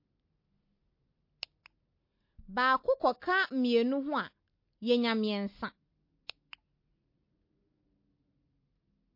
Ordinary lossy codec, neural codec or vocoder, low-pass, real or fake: MP3, 32 kbps; none; 5.4 kHz; real